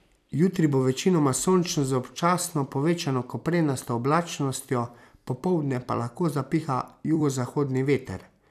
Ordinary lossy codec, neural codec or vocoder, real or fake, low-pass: none; vocoder, 44.1 kHz, 128 mel bands every 256 samples, BigVGAN v2; fake; 14.4 kHz